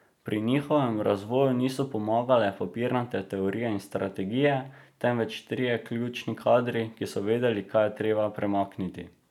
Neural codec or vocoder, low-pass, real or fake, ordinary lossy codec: none; 19.8 kHz; real; none